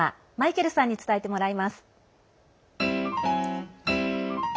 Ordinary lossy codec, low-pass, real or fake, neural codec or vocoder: none; none; real; none